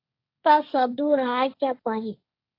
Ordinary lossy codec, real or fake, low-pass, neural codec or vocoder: AAC, 32 kbps; fake; 5.4 kHz; codec, 16 kHz, 1.1 kbps, Voila-Tokenizer